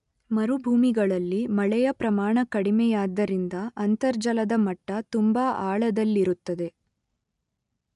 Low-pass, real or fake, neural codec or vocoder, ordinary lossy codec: 10.8 kHz; real; none; none